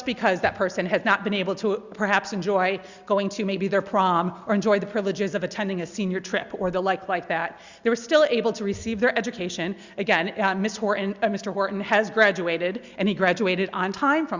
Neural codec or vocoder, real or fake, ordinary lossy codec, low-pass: none; real; Opus, 64 kbps; 7.2 kHz